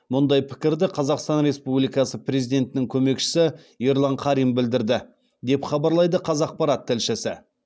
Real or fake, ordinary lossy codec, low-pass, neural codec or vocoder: real; none; none; none